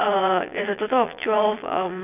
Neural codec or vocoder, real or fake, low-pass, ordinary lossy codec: vocoder, 22.05 kHz, 80 mel bands, Vocos; fake; 3.6 kHz; none